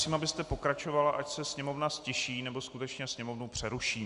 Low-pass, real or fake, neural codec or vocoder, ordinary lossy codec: 10.8 kHz; real; none; Opus, 64 kbps